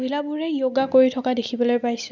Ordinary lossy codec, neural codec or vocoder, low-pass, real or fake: none; none; 7.2 kHz; real